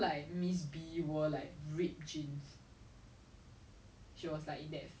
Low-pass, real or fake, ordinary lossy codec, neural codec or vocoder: none; real; none; none